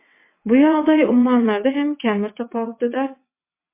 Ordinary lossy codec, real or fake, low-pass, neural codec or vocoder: MP3, 24 kbps; fake; 3.6 kHz; vocoder, 22.05 kHz, 80 mel bands, WaveNeXt